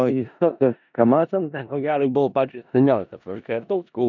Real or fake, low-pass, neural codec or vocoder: fake; 7.2 kHz; codec, 16 kHz in and 24 kHz out, 0.4 kbps, LongCat-Audio-Codec, four codebook decoder